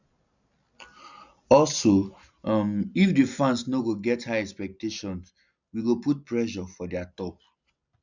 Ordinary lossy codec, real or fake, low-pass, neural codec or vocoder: none; real; 7.2 kHz; none